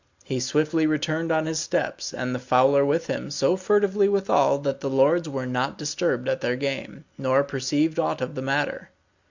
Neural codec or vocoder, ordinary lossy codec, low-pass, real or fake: none; Opus, 64 kbps; 7.2 kHz; real